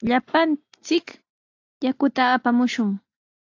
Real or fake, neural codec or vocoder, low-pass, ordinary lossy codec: real; none; 7.2 kHz; AAC, 48 kbps